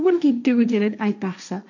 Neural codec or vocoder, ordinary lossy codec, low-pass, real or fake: codec, 16 kHz, 1.1 kbps, Voila-Tokenizer; none; 7.2 kHz; fake